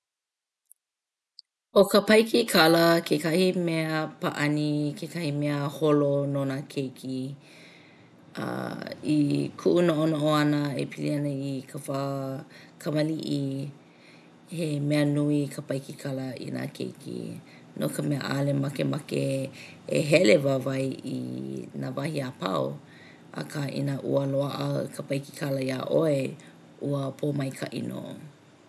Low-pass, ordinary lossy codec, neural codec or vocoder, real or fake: none; none; none; real